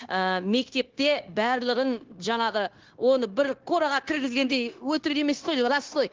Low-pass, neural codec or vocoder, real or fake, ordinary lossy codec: 7.2 kHz; codec, 16 kHz, 0.9 kbps, LongCat-Audio-Codec; fake; Opus, 16 kbps